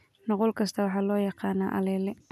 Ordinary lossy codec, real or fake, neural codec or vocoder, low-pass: none; real; none; 14.4 kHz